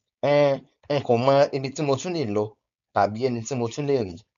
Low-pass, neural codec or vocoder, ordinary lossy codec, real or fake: 7.2 kHz; codec, 16 kHz, 4.8 kbps, FACodec; none; fake